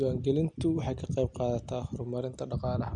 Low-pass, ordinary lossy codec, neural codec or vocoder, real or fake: 9.9 kHz; Opus, 64 kbps; none; real